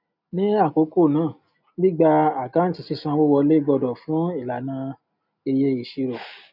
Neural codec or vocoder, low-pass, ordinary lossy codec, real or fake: none; 5.4 kHz; none; real